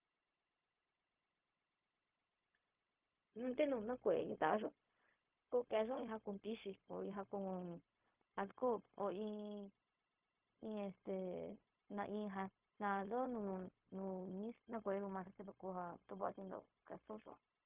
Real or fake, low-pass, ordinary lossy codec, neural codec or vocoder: fake; 3.6 kHz; Opus, 24 kbps; codec, 16 kHz, 0.4 kbps, LongCat-Audio-Codec